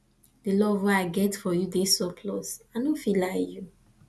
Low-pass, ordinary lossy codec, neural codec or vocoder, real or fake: none; none; none; real